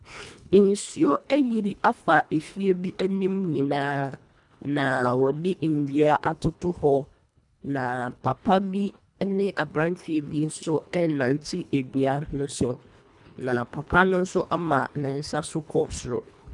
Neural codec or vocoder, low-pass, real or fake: codec, 24 kHz, 1.5 kbps, HILCodec; 10.8 kHz; fake